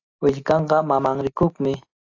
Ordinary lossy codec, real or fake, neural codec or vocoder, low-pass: AAC, 48 kbps; real; none; 7.2 kHz